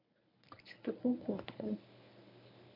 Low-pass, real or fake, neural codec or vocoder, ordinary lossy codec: 5.4 kHz; fake; codec, 24 kHz, 0.9 kbps, WavTokenizer, medium speech release version 1; none